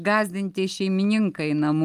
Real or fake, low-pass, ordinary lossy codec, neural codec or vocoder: real; 14.4 kHz; Opus, 32 kbps; none